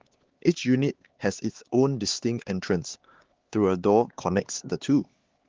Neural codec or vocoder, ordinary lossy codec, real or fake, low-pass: codec, 16 kHz, 4 kbps, X-Codec, HuBERT features, trained on LibriSpeech; Opus, 16 kbps; fake; 7.2 kHz